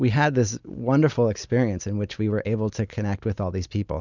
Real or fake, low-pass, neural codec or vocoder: real; 7.2 kHz; none